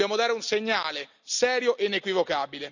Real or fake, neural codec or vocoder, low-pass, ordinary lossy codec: real; none; 7.2 kHz; none